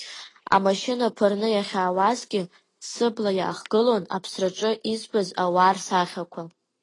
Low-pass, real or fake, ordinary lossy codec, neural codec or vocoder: 10.8 kHz; real; AAC, 32 kbps; none